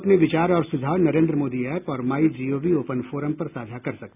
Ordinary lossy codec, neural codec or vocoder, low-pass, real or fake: none; none; 3.6 kHz; real